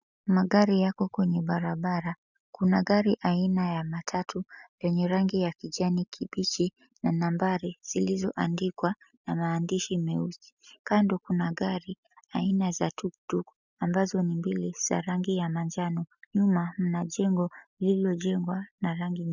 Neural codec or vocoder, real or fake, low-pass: none; real; 7.2 kHz